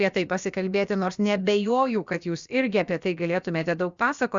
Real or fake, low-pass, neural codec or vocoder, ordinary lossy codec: fake; 7.2 kHz; codec, 16 kHz, about 1 kbps, DyCAST, with the encoder's durations; Opus, 64 kbps